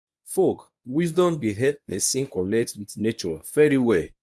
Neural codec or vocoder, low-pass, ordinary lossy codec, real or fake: codec, 24 kHz, 0.9 kbps, WavTokenizer, medium speech release version 1; none; none; fake